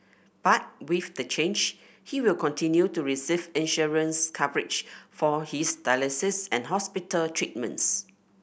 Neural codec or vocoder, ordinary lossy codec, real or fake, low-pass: none; none; real; none